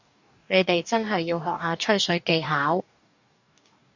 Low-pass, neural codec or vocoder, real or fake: 7.2 kHz; codec, 44.1 kHz, 2.6 kbps, DAC; fake